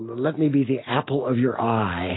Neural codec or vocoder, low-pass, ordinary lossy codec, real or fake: none; 7.2 kHz; AAC, 16 kbps; real